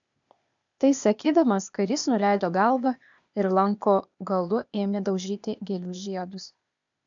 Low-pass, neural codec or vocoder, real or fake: 7.2 kHz; codec, 16 kHz, 0.8 kbps, ZipCodec; fake